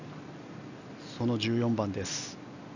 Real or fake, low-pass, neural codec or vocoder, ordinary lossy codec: real; 7.2 kHz; none; none